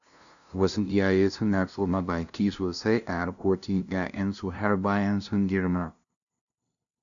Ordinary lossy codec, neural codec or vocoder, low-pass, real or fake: none; codec, 16 kHz, 0.5 kbps, FunCodec, trained on LibriTTS, 25 frames a second; 7.2 kHz; fake